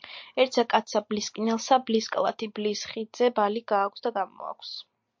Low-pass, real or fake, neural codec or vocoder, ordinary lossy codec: 7.2 kHz; real; none; MP3, 48 kbps